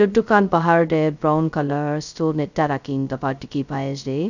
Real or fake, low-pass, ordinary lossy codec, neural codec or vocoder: fake; 7.2 kHz; none; codec, 16 kHz, 0.2 kbps, FocalCodec